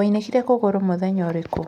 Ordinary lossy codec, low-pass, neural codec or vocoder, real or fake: none; 19.8 kHz; none; real